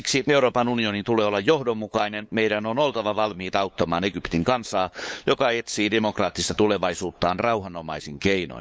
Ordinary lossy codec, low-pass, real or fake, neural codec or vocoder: none; none; fake; codec, 16 kHz, 8 kbps, FunCodec, trained on LibriTTS, 25 frames a second